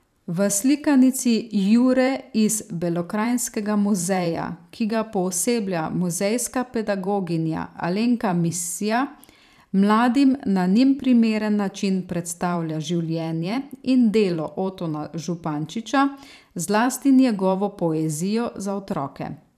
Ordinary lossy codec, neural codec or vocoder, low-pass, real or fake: none; vocoder, 44.1 kHz, 128 mel bands every 512 samples, BigVGAN v2; 14.4 kHz; fake